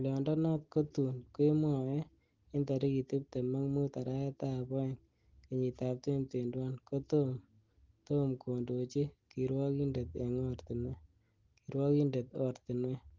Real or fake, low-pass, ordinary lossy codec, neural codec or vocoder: real; 7.2 kHz; Opus, 16 kbps; none